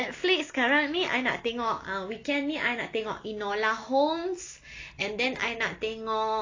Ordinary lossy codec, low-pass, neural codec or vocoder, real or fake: AAC, 32 kbps; 7.2 kHz; none; real